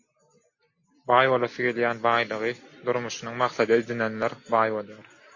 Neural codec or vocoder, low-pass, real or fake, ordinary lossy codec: none; 7.2 kHz; real; MP3, 32 kbps